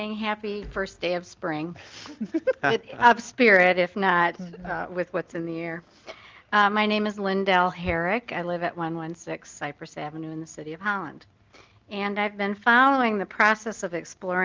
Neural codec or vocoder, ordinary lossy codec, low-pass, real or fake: none; Opus, 32 kbps; 7.2 kHz; real